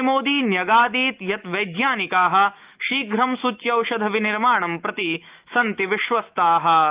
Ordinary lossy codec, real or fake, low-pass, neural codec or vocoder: Opus, 24 kbps; fake; 3.6 kHz; autoencoder, 48 kHz, 128 numbers a frame, DAC-VAE, trained on Japanese speech